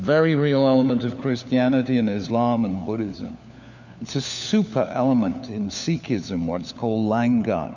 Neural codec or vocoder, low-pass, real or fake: codec, 16 kHz, 4 kbps, FunCodec, trained on LibriTTS, 50 frames a second; 7.2 kHz; fake